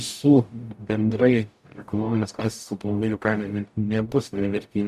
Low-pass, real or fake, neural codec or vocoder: 14.4 kHz; fake; codec, 44.1 kHz, 0.9 kbps, DAC